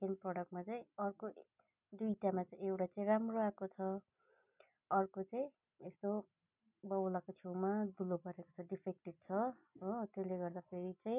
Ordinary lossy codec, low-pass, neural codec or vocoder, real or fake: none; 3.6 kHz; none; real